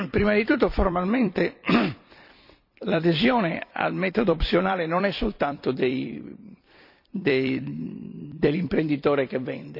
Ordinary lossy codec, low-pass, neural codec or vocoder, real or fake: none; 5.4 kHz; vocoder, 44.1 kHz, 128 mel bands every 256 samples, BigVGAN v2; fake